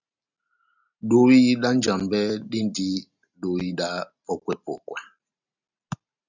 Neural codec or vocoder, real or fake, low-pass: none; real; 7.2 kHz